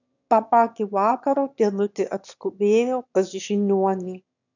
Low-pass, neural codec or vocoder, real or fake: 7.2 kHz; autoencoder, 22.05 kHz, a latent of 192 numbers a frame, VITS, trained on one speaker; fake